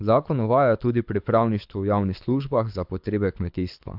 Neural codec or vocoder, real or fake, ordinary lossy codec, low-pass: codec, 24 kHz, 6 kbps, HILCodec; fake; AAC, 48 kbps; 5.4 kHz